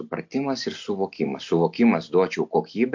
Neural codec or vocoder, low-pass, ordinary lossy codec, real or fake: none; 7.2 kHz; MP3, 48 kbps; real